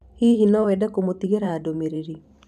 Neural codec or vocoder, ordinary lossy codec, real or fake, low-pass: vocoder, 44.1 kHz, 128 mel bands every 512 samples, BigVGAN v2; none; fake; 14.4 kHz